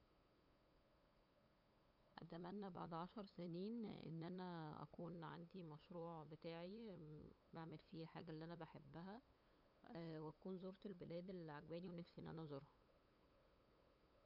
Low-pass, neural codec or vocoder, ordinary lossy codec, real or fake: 5.4 kHz; codec, 16 kHz, 8 kbps, FunCodec, trained on LibriTTS, 25 frames a second; none; fake